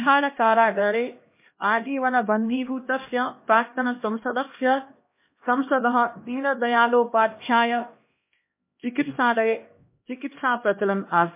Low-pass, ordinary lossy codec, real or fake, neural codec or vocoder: 3.6 kHz; MP3, 24 kbps; fake; codec, 16 kHz, 1 kbps, X-Codec, HuBERT features, trained on LibriSpeech